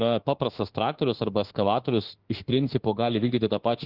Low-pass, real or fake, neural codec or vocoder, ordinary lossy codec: 5.4 kHz; fake; autoencoder, 48 kHz, 32 numbers a frame, DAC-VAE, trained on Japanese speech; Opus, 16 kbps